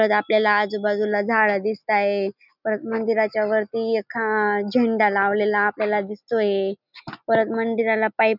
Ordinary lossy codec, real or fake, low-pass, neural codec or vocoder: none; real; 5.4 kHz; none